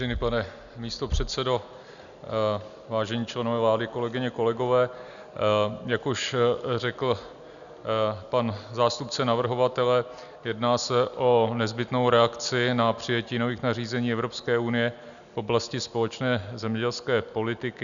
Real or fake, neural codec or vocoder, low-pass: real; none; 7.2 kHz